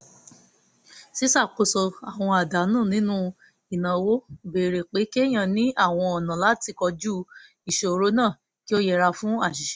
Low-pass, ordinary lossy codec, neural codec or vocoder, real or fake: none; none; none; real